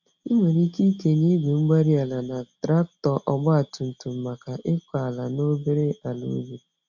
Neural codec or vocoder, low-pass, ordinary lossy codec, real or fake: none; 7.2 kHz; none; real